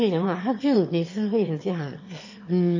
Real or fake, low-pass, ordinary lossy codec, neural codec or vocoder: fake; 7.2 kHz; MP3, 32 kbps; autoencoder, 22.05 kHz, a latent of 192 numbers a frame, VITS, trained on one speaker